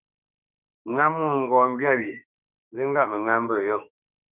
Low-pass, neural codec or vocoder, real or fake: 3.6 kHz; autoencoder, 48 kHz, 32 numbers a frame, DAC-VAE, trained on Japanese speech; fake